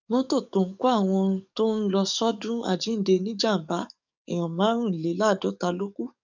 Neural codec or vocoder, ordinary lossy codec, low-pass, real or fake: codec, 44.1 kHz, 7.8 kbps, DAC; none; 7.2 kHz; fake